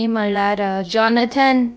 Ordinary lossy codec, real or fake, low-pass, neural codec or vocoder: none; fake; none; codec, 16 kHz, about 1 kbps, DyCAST, with the encoder's durations